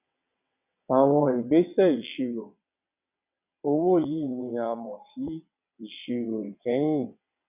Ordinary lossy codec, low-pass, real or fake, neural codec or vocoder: none; 3.6 kHz; fake; vocoder, 22.05 kHz, 80 mel bands, WaveNeXt